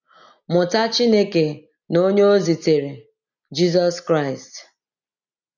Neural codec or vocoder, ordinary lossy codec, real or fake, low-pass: none; none; real; 7.2 kHz